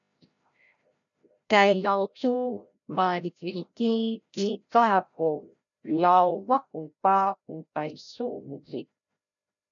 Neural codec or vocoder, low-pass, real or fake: codec, 16 kHz, 0.5 kbps, FreqCodec, larger model; 7.2 kHz; fake